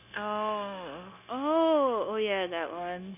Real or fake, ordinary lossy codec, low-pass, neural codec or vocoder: fake; none; 3.6 kHz; codec, 16 kHz, 0.9 kbps, LongCat-Audio-Codec